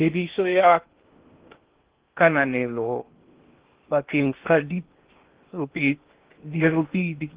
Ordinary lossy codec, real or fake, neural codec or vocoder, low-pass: Opus, 16 kbps; fake; codec, 16 kHz in and 24 kHz out, 0.6 kbps, FocalCodec, streaming, 2048 codes; 3.6 kHz